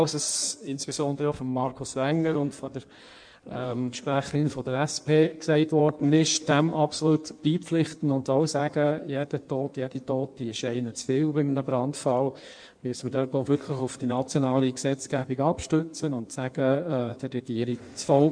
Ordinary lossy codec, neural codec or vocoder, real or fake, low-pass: none; codec, 16 kHz in and 24 kHz out, 1.1 kbps, FireRedTTS-2 codec; fake; 9.9 kHz